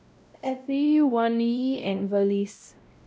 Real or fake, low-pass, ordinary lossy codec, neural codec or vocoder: fake; none; none; codec, 16 kHz, 0.5 kbps, X-Codec, WavLM features, trained on Multilingual LibriSpeech